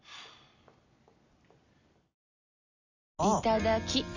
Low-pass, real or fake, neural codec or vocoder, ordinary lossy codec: 7.2 kHz; real; none; none